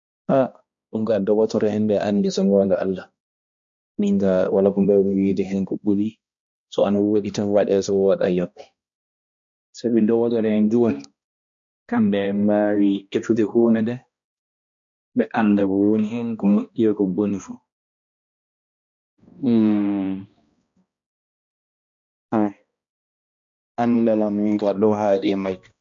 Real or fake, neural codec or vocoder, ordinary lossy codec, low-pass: fake; codec, 16 kHz, 1 kbps, X-Codec, HuBERT features, trained on balanced general audio; MP3, 48 kbps; 7.2 kHz